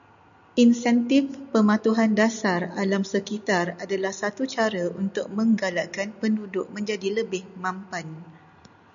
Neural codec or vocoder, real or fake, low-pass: none; real; 7.2 kHz